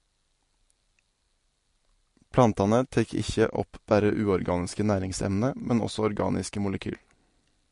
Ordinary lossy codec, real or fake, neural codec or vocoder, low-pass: MP3, 48 kbps; real; none; 10.8 kHz